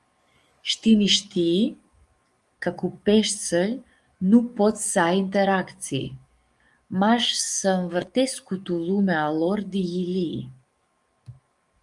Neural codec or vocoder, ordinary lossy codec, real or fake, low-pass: codec, 44.1 kHz, 7.8 kbps, DAC; Opus, 32 kbps; fake; 10.8 kHz